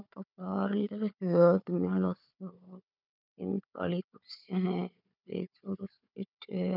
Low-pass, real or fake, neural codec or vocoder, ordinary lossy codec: 5.4 kHz; fake; codec, 16 kHz, 4 kbps, FunCodec, trained on Chinese and English, 50 frames a second; none